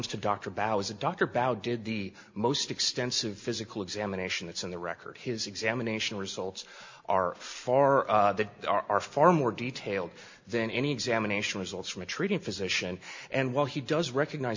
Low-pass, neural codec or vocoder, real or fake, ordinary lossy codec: 7.2 kHz; none; real; MP3, 48 kbps